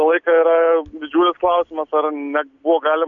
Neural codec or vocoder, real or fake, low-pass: none; real; 7.2 kHz